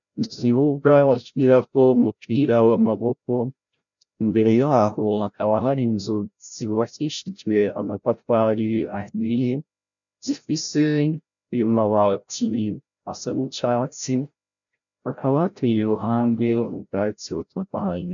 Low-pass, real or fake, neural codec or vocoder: 7.2 kHz; fake; codec, 16 kHz, 0.5 kbps, FreqCodec, larger model